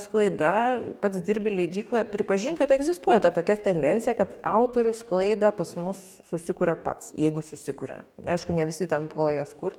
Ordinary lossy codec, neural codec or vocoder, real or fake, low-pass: MP3, 96 kbps; codec, 44.1 kHz, 2.6 kbps, DAC; fake; 19.8 kHz